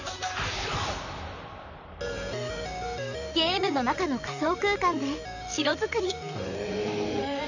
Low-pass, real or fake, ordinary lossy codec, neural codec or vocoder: 7.2 kHz; fake; none; vocoder, 44.1 kHz, 128 mel bands, Pupu-Vocoder